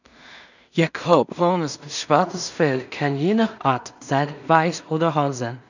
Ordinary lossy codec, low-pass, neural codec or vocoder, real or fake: none; 7.2 kHz; codec, 16 kHz in and 24 kHz out, 0.4 kbps, LongCat-Audio-Codec, two codebook decoder; fake